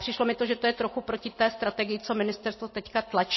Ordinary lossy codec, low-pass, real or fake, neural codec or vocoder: MP3, 24 kbps; 7.2 kHz; real; none